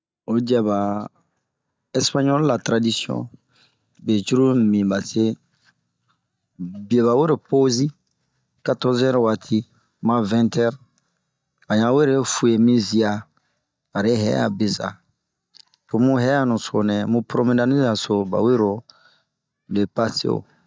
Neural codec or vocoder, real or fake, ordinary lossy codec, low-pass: none; real; none; none